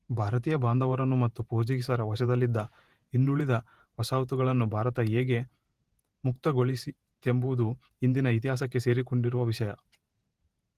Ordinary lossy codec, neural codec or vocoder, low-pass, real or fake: Opus, 24 kbps; vocoder, 48 kHz, 128 mel bands, Vocos; 14.4 kHz; fake